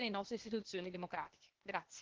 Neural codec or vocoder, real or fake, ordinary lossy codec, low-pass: codec, 16 kHz, 0.8 kbps, ZipCodec; fake; Opus, 16 kbps; 7.2 kHz